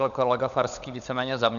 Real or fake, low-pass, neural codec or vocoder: fake; 7.2 kHz; codec, 16 kHz, 16 kbps, FunCodec, trained on LibriTTS, 50 frames a second